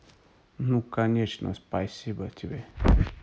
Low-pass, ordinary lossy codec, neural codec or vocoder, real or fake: none; none; none; real